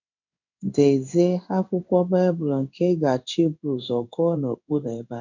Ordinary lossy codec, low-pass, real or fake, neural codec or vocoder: none; 7.2 kHz; fake; codec, 16 kHz in and 24 kHz out, 1 kbps, XY-Tokenizer